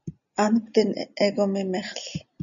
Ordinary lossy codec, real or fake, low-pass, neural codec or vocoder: MP3, 96 kbps; real; 7.2 kHz; none